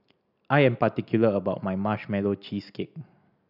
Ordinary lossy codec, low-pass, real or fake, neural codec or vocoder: none; 5.4 kHz; real; none